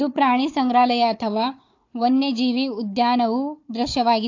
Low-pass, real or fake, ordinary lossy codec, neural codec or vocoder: 7.2 kHz; fake; AAC, 48 kbps; codec, 16 kHz, 4 kbps, FunCodec, trained on Chinese and English, 50 frames a second